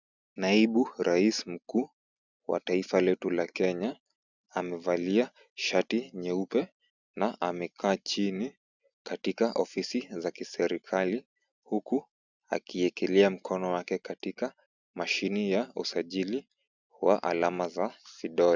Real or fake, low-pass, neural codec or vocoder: real; 7.2 kHz; none